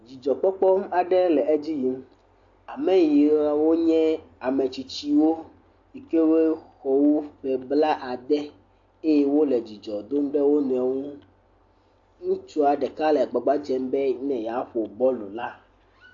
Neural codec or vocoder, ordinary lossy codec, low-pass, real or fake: none; AAC, 48 kbps; 7.2 kHz; real